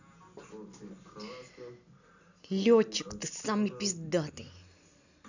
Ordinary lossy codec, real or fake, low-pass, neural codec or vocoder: none; real; 7.2 kHz; none